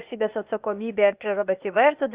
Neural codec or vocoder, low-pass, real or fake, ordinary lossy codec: codec, 16 kHz, 0.8 kbps, ZipCodec; 3.6 kHz; fake; Opus, 64 kbps